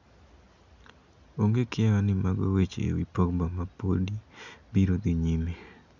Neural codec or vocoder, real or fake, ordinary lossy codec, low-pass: none; real; none; 7.2 kHz